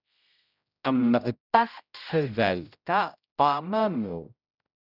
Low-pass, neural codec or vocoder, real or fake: 5.4 kHz; codec, 16 kHz, 0.5 kbps, X-Codec, HuBERT features, trained on general audio; fake